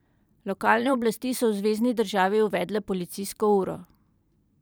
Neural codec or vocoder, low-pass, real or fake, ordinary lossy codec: vocoder, 44.1 kHz, 128 mel bands every 512 samples, BigVGAN v2; none; fake; none